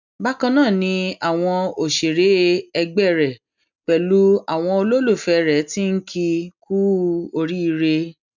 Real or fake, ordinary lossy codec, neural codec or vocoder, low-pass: real; none; none; 7.2 kHz